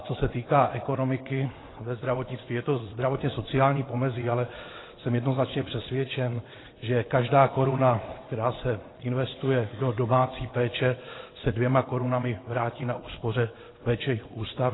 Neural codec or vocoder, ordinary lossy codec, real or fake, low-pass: vocoder, 22.05 kHz, 80 mel bands, WaveNeXt; AAC, 16 kbps; fake; 7.2 kHz